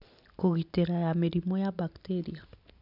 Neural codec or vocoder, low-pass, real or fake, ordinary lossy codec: none; 5.4 kHz; real; none